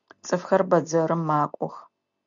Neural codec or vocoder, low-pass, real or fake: none; 7.2 kHz; real